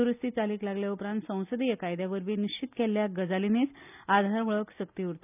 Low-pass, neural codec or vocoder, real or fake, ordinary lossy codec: 3.6 kHz; none; real; none